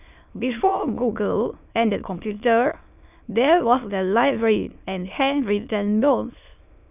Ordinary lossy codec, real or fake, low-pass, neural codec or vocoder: none; fake; 3.6 kHz; autoencoder, 22.05 kHz, a latent of 192 numbers a frame, VITS, trained on many speakers